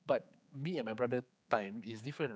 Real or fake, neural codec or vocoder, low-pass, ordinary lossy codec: fake; codec, 16 kHz, 4 kbps, X-Codec, HuBERT features, trained on general audio; none; none